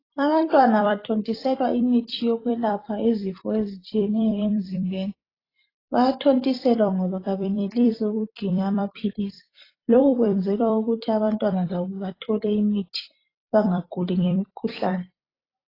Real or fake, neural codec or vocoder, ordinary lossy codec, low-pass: fake; vocoder, 44.1 kHz, 128 mel bands every 256 samples, BigVGAN v2; AAC, 24 kbps; 5.4 kHz